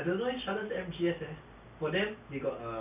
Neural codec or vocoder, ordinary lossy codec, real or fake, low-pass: none; none; real; 3.6 kHz